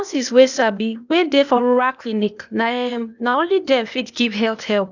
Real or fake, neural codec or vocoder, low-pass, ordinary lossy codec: fake; codec, 16 kHz, 0.8 kbps, ZipCodec; 7.2 kHz; none